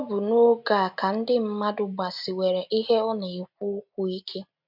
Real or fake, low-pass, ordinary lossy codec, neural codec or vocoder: real; 5.4 kHz; MP3, 48 kbps; none